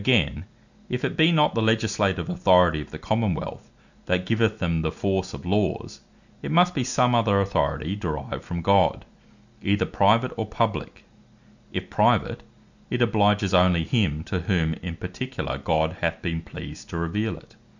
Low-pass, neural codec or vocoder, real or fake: 7.2 kHz; none; real